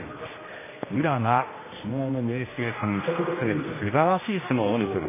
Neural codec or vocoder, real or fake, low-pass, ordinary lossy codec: codec, 16 kHz, 1 kbps, X-Codec, HuBERT features, trained on general audio; fake; 3.6 kHz; MP3, 16 kbps